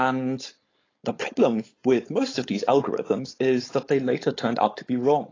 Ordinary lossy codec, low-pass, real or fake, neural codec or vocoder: AAC, 32 kbps; 7.2 kHz; fake; codec, 16 kHz, 4.8 kbps, FACodec